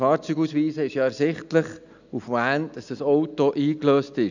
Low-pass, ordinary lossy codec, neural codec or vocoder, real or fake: 7.2 kHz; none; none; real